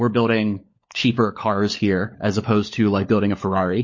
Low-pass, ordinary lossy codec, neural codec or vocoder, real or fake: 7.2 kHz; MP3, 32 kbps; codec, 16 kHz, 16 kbps, FunCodec, trained on LibriTTS, 50 frames a second; fake